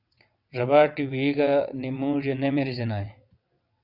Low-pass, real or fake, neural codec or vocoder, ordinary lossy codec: 5.4 kHz; fake; vocoder, 22.05 kHz, 80 mel bands, WaveNeXt; Opus, 64 kbps